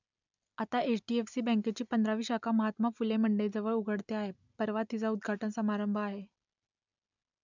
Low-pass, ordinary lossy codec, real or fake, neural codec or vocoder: 7.2 kHz; none; real; none